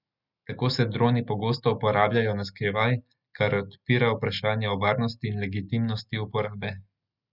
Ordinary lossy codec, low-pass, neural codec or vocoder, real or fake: none; 5.4 kHz; none; real